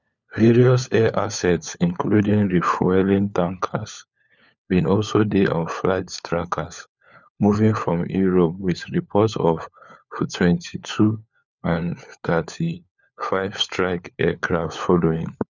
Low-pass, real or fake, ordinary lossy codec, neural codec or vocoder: 7.2 kHz; fake; none; codec, 16 kHz, 4 kbps, FunCodec, trained on LibriTTS, 50 frames a second